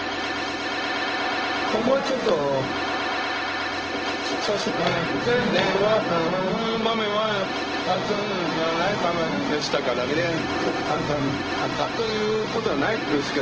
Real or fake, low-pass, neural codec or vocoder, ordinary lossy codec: fake; 7.2 kHz; codec, 16 kHz, 0.4 kbps, LongCat-Audio-Codec; Opus, 16 kbps